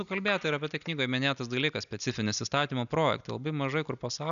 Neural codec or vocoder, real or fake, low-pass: none; real; 7.2 kHz